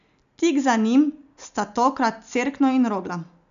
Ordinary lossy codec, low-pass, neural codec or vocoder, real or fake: none; 7.2 kHz; none; real